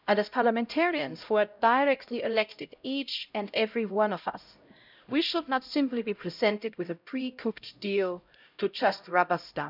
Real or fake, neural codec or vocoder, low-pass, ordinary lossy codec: fake; codec, 16 kHz, 0.5 kbps, X-Codec, HuBERT features, trained on LibriSpeech; 5.4 kHz; none